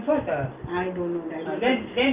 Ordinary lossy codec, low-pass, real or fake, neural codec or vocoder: Opus, 24 kbps; 3.6 kHz; real; none